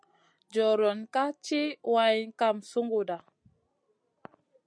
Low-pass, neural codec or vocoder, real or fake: 9.9 kHz; none; real